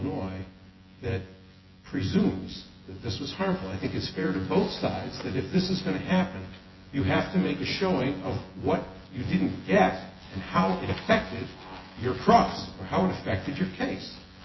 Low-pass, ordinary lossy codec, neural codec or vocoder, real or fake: 7.2 kHz; MP3, 24 kbps; vocoder, 24 kHz, 100 mel bands, Vocos; fake